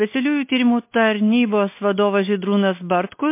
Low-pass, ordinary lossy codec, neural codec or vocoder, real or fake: 3.6 kHz; MP3, 24 kbps; none; real